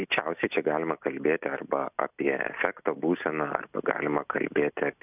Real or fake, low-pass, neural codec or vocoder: real; 3.6 kHz; none